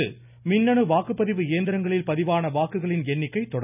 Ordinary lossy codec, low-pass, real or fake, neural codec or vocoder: none; 3.6 kHz; real; none